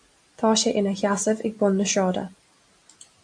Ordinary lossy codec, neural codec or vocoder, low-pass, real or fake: AAC, 64 kbps; none; 9.9 kHz; real